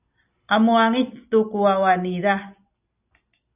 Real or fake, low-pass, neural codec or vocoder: real; 3.6 kHz; none